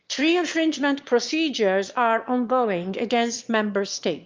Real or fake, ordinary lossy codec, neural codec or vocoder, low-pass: fake; Opus, 24 kbps; autoencoder, 22.05 kHz, a latent of 192 numbers a frame, VITS, trained on one speaker; 7.2 kHz